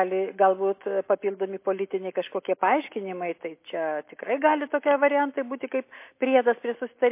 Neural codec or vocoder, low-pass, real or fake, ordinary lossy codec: none; 3.6 kHz; real; MP3, 24 kbps